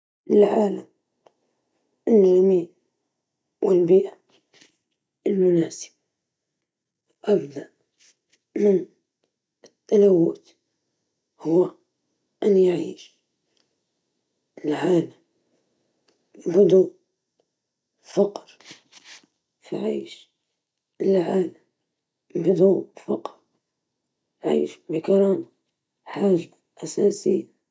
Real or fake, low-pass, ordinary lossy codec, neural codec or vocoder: real; none; none; none